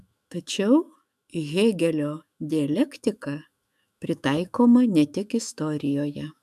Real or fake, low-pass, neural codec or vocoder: fake; 14.4 kHz; autoencoder, 48 kHz, 128 numbers a frame, DAC-VAE, trained on Japanese speech